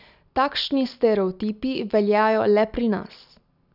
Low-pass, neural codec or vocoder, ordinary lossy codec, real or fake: 5.4 kHz; none; none; real